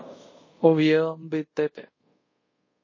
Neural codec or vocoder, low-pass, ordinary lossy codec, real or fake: codec, 24 kHz, 0.5 kbps, DualCodec; 7.2 kHz; MP3, 32 kbps; fake